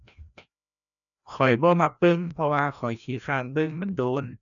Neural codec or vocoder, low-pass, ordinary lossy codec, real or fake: codec, 16 kHz, 1 kbps, FreqCodec, larger model; 7.2 kHz; none; fake